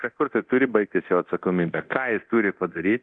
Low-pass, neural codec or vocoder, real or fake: 9.9 kHz; codec, 24 kHz, 0.9 kbps, DualCodec; fake